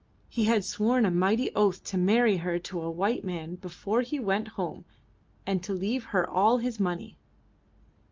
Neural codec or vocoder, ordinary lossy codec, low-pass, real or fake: none; Opus, 24 kbps; 7.2 kHz; real